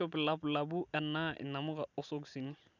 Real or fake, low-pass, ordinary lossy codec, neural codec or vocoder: real; 7.2 kHz; none; none